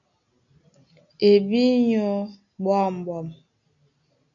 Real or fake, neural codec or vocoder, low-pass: real; none; 7.2 kHz